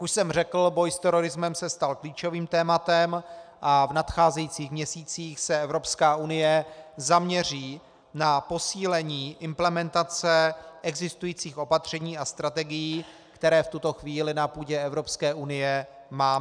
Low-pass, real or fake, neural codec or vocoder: 9.9 kHz; real; none